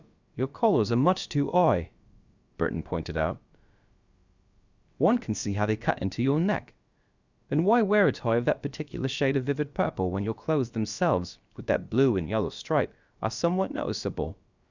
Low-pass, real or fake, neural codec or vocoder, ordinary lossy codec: 7.2 kHz; fake; codec, 16 kHz, about 1 kbps, DyCAST, with the encoder's durations; Opus, 64 kbps